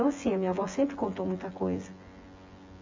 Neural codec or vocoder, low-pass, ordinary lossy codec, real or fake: vocoder, 24 kHz, 100 mel bands, Vocos; 7.2 kHz; MP3, 64 kbps; fake